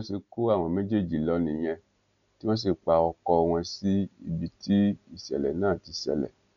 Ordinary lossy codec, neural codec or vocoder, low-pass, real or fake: none; none; 7.2 kHz; real